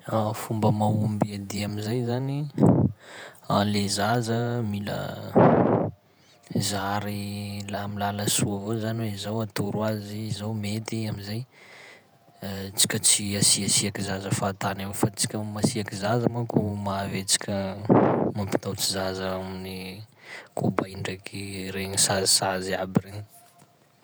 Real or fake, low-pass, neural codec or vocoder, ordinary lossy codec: fake; none; vocoder, 48 kHz, 128 mel bands, Vocos; none